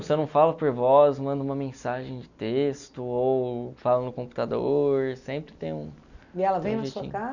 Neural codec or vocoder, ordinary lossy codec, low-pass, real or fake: none; none; 7.2 kHz; real